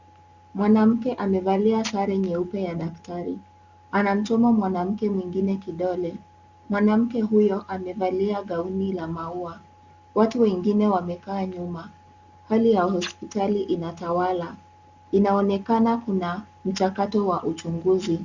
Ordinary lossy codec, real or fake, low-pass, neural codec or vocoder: Opus, 64 kbps; real; 7.2 kHz; none